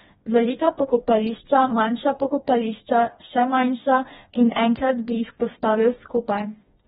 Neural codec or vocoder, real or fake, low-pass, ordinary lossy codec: codec, 16 kHz, 2 kbps, FreqCodec, smaller model; fake; 7.2 kHz; AAC, 16 kbps